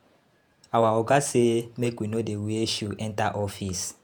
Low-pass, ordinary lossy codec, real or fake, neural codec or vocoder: none; none; fake; vocoder, 48 kHz, 128 mel bands, Vocos